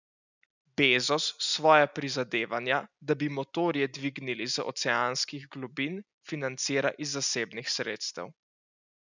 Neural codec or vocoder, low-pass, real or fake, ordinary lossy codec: none; 7.2 kHz; real; none